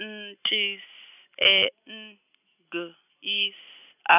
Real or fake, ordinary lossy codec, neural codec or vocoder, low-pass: real; none; none; 3.6 kHz